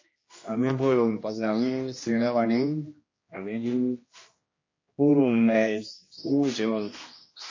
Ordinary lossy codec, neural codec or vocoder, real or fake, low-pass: MP3, 32 kbps; codec, 16 kHz, 1 kbps, X-Codec, HuBERT features, trained on general audio; fake; 7.2 kHz